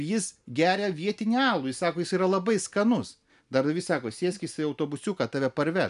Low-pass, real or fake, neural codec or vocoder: 10.8 kHz; real; none